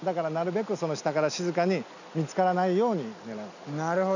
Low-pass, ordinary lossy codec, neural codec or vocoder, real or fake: 7.2 kHz; none; none; real